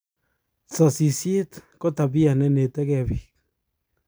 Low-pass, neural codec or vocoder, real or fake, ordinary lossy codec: none; none; real; none